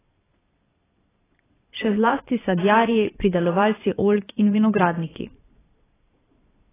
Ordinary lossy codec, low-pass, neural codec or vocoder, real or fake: AAC, 16 kbps; 3.6 kHz; vocoder, 22.05 kHz, 80 mel bands, Vocos; fake